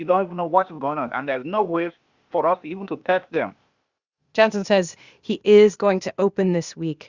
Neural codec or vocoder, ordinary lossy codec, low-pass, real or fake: codec, 16 kHz, 0.8 kbps, ZipCodec; Opus, 64 kbps; 7.2 kHz; fake